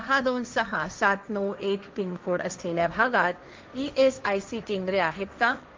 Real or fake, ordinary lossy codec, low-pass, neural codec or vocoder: fake; Opus, 24 kbps; 7.2 kHz; codec, 16 kHz, 1.1 kbps, Voila-Tokenizer